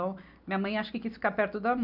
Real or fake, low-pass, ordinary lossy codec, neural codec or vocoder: real; 5.4 kHz; none; none